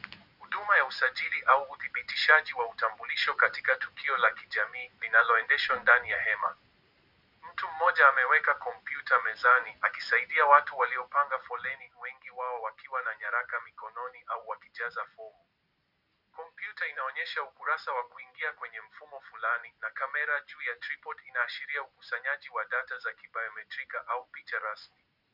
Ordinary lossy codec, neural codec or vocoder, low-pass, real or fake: Opus, 64 kbps; none; 5.4 kHz; real